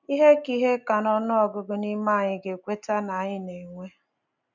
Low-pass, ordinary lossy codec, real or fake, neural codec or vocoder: 7.2 kHz; none; real; none